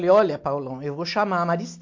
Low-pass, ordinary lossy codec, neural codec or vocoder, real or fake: 7.2 kHz; MP3, 48 kbps; none; real